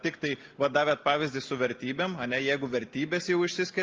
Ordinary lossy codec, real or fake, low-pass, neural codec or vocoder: Opus, 24 kbps; real; 7.2 kHz; none